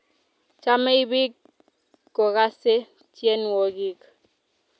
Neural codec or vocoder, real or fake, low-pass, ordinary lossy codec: none; real; none; none